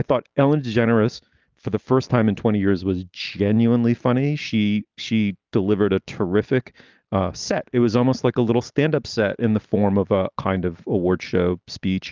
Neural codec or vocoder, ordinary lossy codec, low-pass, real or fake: none; Opus, 32 kbps; 7.2 kHz; real